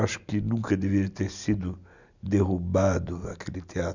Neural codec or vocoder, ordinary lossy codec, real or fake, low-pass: none; none; real; 7.2 kHz